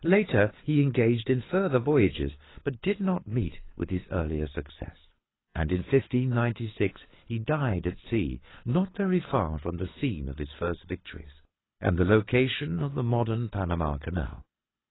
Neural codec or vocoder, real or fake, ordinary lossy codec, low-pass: codec, 24 kHz, 6 kbps, HILCodec; fake; AAC, 16 kbps; 7.2 kHz